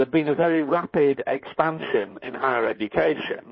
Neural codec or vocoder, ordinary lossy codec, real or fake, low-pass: codec, 16 kHz in and 24 kHz out, 2.2 kbps, FireRedTTS-2 codec; MP3, 24 kbps; fake; 7.2 kHz